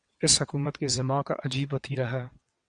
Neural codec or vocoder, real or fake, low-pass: vocoder, 22.05 kHz, 80 mel bands, WaveNeXt; fake; 9.9 kHz